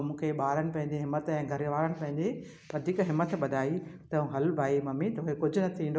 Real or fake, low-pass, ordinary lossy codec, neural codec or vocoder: real; none; none; none